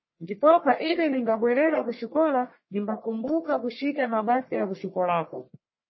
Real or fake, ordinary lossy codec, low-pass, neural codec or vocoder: fake; MP3, 24 kbps; 7.2 kHz; codec, 44.1 kHz, 1.7 kbps, Pupu-Codec